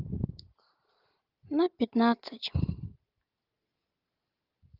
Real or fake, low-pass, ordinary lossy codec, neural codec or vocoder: real; 5.4 kHz; Opus, 32 kbps; none